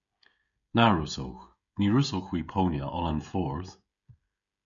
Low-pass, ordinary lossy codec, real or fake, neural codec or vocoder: 7.2 kHz; MP3, 96 kbps; fake; codec, 16 kHz, 16 kbps, FreqCodec, smaller model